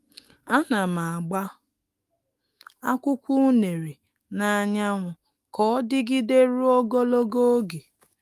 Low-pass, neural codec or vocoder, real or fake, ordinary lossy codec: 14.4 kHz; autoencoder, 48 kHz, 128 numbers a frame, DAC-VAE, trained on Japanese speech; fake; Opus, 32 kbps